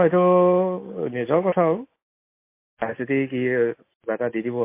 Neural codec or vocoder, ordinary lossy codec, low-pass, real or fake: none; MP3, 24 kbps; 3.6 kHz; real